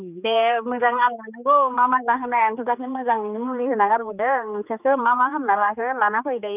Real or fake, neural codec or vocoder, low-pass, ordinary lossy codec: fake; codec, 16 kHz, 4 kbps, X-Codec, HuBERT features, trained on general audio; 3.6 kHz; none